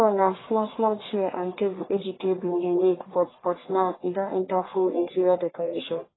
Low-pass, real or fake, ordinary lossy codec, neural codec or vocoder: 7.2 kHz; fake; AAC, 16 kbps; codec, 44.1 kHz, 1.7 kbps, Pupu-Codec